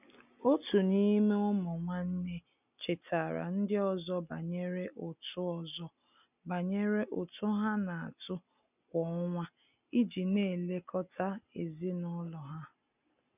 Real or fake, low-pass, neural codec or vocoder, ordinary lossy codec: real; 3.6 kHz; none; none